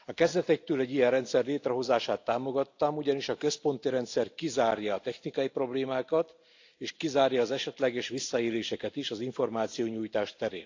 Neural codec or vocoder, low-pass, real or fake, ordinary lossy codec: none; 7.2 kHz; real; AAC, 48 kbps